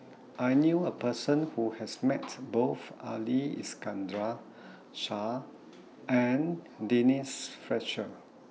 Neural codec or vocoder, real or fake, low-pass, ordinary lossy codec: none; real; none; none